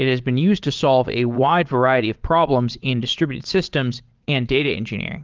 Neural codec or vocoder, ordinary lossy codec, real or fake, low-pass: vocoder, 22.05 kHz, 80 mel bands, Vocos; Opus, 32 kbps; fake; 7.2 kHz